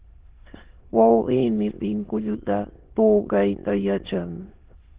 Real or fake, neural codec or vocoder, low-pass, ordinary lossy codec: fake; autoencoder, 22.05 kHz, a latent of 192 numbers a frame, VITS, trained on many speakers; 3.6 kHz; Opus, 16 kbps